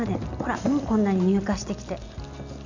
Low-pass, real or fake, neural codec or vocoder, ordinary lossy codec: 7.2 kHz; real; none; none